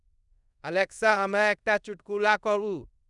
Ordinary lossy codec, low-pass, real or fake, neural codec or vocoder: none; 10.8 kHz; fake; codec, 24 kHz, 0.5 kbps, DualCodec